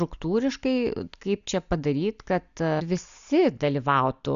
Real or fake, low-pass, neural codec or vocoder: real; 7.2 kHz; none